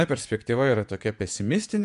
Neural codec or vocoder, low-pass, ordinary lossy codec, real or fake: vocoder, 24 kHz, 100 mel bands, Vocos; 10.8 kHz; MP3, 96 kbps; fake